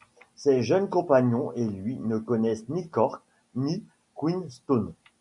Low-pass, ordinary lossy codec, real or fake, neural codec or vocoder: 10.8 kHz; MP3, 96 kbps; real; none